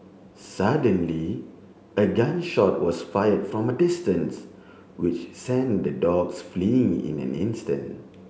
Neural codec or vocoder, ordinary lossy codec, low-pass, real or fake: none; none; none; real